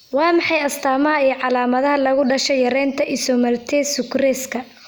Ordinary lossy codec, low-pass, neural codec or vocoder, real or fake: none; none; none; real